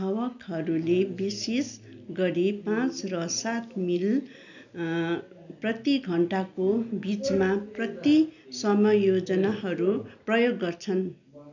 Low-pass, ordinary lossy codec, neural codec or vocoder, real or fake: 7.2 kHz; none; none; real